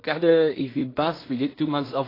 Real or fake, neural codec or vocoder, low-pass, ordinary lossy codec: fake; codec, 16 kHz in and 24 kHz out, 0.4 kbps, LongCat-Audio-Codec, two codebook decoder; 5.4 kHz; AAC, 24 kbps